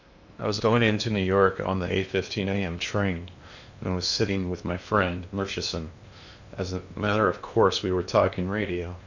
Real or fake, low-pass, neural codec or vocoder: fake; 7.2 kHz; codec, 16 kHz in and 24 kHz out, 0.8 kbps, FocalCodec, streaming, 65536 codes